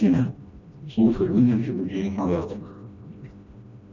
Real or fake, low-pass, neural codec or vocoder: fake; 7.2 kHz; codec, 16 kHz, 1 kbps, FreqCodec, smaller model